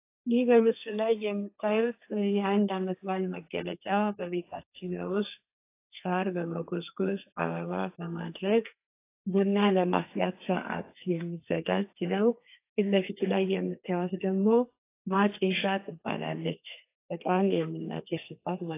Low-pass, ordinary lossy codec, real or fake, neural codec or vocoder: 3.6 kHz; AAC, 24 kbps; fake; codec, 32 kHz, 1.9 kbps, SNAC